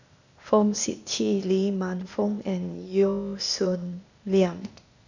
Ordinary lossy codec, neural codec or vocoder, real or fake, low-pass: none; codec, 16 kHz, 0.8 kbps, ZipCodec; fake; 7.2 kHz